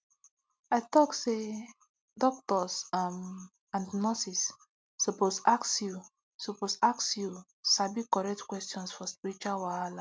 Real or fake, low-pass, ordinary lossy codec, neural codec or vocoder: real; none; none; none